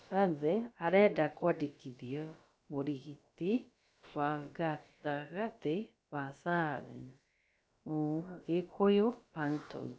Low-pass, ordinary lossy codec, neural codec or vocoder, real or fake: none; none; codec, 16 kHz, about 1 kbps, DyCAST, with the encoder's durations; fake